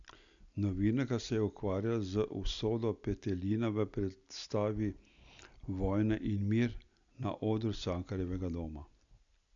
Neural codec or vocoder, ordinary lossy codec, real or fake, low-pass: none; none; real; 7.2 kHz